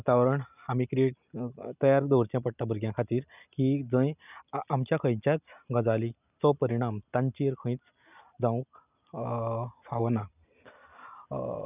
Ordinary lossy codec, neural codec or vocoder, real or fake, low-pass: none; none; real; 3.6 kHz